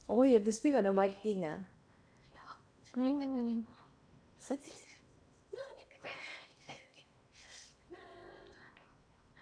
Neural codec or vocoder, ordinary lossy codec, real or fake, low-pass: codec, 16 kHz in and 24 kHz out, 0.8 kbps, FocalCodec, streaming, 65536 codes; none; fake; 9.9 kHz